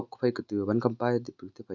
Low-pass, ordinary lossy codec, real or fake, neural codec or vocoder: 7.2 kHz; none; real; none